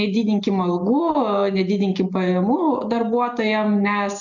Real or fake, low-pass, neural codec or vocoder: real; 7.2 kHz; none